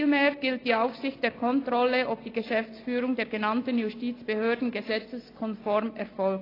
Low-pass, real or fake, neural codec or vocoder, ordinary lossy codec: 5.4 kHz; real; none; AAC, 24 kbps